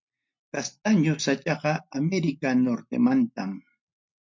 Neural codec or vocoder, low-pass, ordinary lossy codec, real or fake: none; 7.2 kHz; MP3, 48 kbps; real